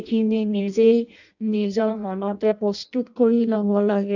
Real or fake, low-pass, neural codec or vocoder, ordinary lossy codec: fake; 7.2 kHz; codec, 16 kHz in and 24 kHz out, 0.6 kbps, FireRedTTS-2 codec; none